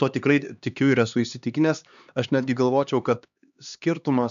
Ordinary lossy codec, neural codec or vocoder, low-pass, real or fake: MP3, 96 kbps; codec, 16 kHz, 2 kbps, X-Codec, HuBERT features, trained on LibriSpeech; 7.2 kHz; fake